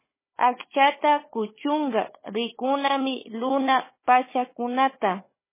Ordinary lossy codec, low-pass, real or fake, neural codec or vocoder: MP3, 16 kbps; 3.6 kHz; fake; codec, 16 kHz, 4 kbps, FunCodec, trained on Chinese and English, 50 frames a second